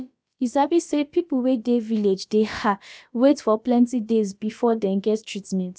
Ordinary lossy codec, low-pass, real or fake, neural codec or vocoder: none; none; fake; codec, 16 kHz, about 1 kbps, DyCAST, with the encoder's durations